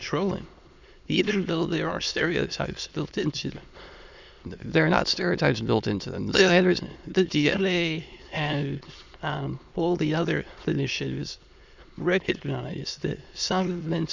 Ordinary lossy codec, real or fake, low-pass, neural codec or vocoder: Opus, 64 kbps; fake; 7.2 kHz; autoencoder, 22.05 kHz, a latent of 192 numbers a frame, VITS, trained on many speakers